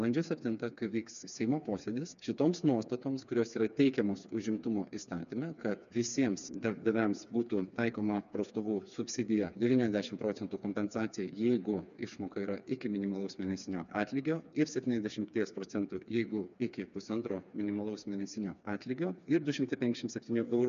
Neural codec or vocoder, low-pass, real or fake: codec, 16 kHz, 4 kbps, FreqCodec, smaller model; 7.2 kHz; fake